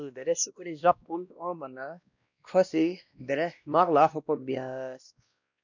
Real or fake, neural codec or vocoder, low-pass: fake; codec, 16 kHz, 1 kbps, X-Codec, WavLM features, trained on Multilingual LibriSpeech; 7.2 kHz